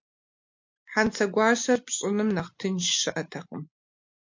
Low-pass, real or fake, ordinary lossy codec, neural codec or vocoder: 7.2 kHz; real; MP3, 48 kbps; none